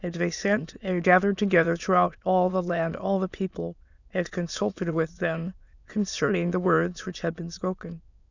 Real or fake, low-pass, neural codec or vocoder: fake; 7.2 kHz; autoencoder, 22.05 kHz, a latent of 192 numbers a frame, VITS, trained on many speakers